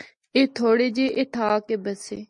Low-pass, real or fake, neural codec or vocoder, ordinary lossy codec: 10.8 kHz; real; none; MP3, 48 kbps